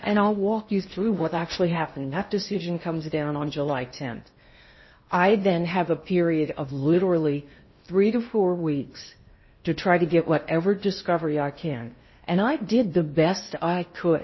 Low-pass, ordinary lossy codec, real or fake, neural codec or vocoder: 7.2 kHz; MP3, 24 kbps; fake; codec, 16 kHz in and 24 kHz out, 0.8 kbps, FocalCodec, streaming, 65536 codes